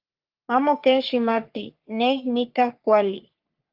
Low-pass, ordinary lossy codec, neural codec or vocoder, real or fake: 5.4 kHz; Opus, 32 kbps; codec, 44.1 kHz, 3.4 kbps, Pupu-Codec; fake